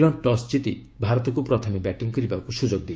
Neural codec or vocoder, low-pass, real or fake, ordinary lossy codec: codec, 16 kHz, 6 kbps, DAC; none; fake; none